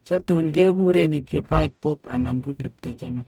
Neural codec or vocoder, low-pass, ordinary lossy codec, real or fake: codec, 44.1 kHz, 0.9 kbps, DAC; 19.8 kHz; none; fake